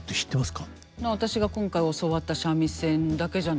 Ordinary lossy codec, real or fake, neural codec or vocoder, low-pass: none; real; none; none